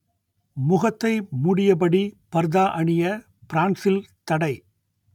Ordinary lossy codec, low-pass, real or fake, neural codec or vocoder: none; 19.8 kHz; real; none